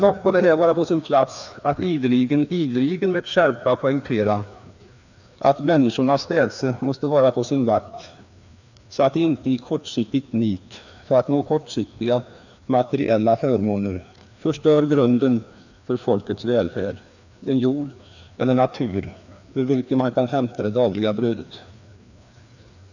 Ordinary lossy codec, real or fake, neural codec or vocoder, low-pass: none; fake; codec, 16 kHz, 2 kbps, FreqCodec, larger model; 7.2 kHz